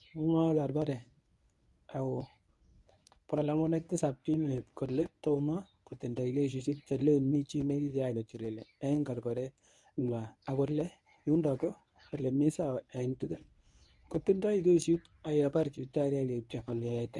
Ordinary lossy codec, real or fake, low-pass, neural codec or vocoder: none; fake; none; codec, 24 kHz, 0.9 kbps, WavTokenizer, medium speech release version 1